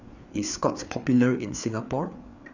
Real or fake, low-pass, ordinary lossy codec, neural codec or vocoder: fake; 7.2 kHz; none; codec, 16 kHz, 4 kbps, FreqCodec, larger model